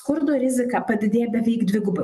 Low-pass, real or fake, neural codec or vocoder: 14.4 kHz; real; none